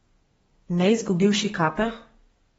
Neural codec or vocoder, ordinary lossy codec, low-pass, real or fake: codec, 32 kHz, 1.9 kbps, SNAC; AAC, 24 kbps; 14.4 kHz; fake